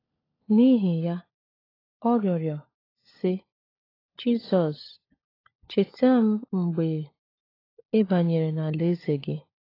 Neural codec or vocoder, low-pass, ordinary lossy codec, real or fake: codec, 16 kHz, 16 kbps, FunCodec, trained on LibriTTS, 50 frames a second; 5.4 kHz; AAC, 24 kbps; fake